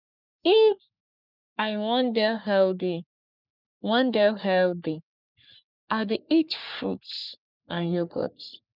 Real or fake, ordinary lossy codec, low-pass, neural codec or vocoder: fake; none; 5.4 kHz; codec, 44.1 kHz, 3.4 kbps, Pupu-Codec